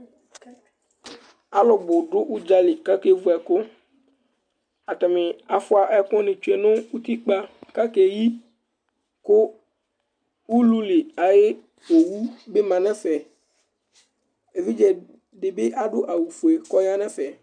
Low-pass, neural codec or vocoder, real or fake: 9.9 kHz; none; real